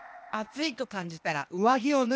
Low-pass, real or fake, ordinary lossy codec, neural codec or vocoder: none; fake; none; codec, 16 kHz, 0.8 kbps, ZipCodec